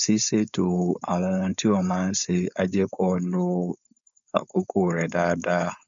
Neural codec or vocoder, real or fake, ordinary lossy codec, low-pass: codec, 16 kHz, 4.8 kbps, FACodec; fake; none; 7.2 kHz